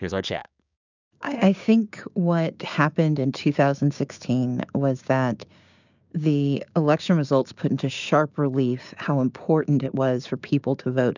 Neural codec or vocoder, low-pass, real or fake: codec, 16 kHz, 6 kbps, DAC; 7.2 kHz; fake